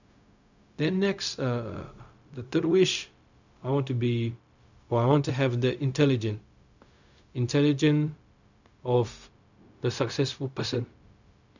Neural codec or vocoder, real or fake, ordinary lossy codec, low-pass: codec, 16 kHz, 0.4 kbps, LongCat-Audio-Codec; fake; none; 7.2 kHz